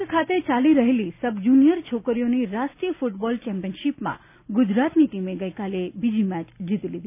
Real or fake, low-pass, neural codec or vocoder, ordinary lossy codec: real; 3.6 kHz; none; none